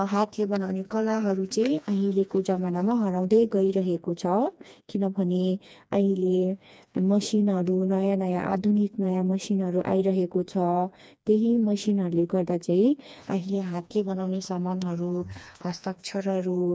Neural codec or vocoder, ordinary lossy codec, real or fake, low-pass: codec, 16 kHz, 2 kbps, FreqCodec, smaller model; none; fake; none